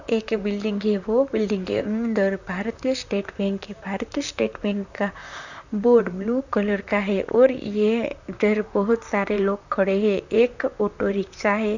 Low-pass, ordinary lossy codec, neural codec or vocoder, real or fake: 7.2 kHz; none; vocoder, 44.1 kHz, 128 mel bands, Pupu-Vocoder; fake